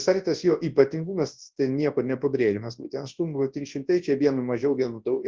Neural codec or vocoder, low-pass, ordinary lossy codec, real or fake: codec, 24 kHz, 0.9 kbps, WavTokenizer, large speech release; 7.2 kHz; Opus, 16 kbps; fake